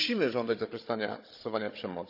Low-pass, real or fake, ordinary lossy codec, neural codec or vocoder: 5.4 kHz; fake; none; codec, 16 kHz, 16 kbps, FunCodec, trained on Chinese and English, 50 frames a second